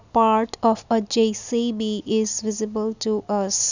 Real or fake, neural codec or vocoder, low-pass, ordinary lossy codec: real; none; 7.2 kHz; none